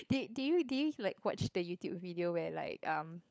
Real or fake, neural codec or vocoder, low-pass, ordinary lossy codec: fake; codec, 16 kHz, 16 kbps, FunCodec, trained on LibriTTS, 50 frames a second; none; none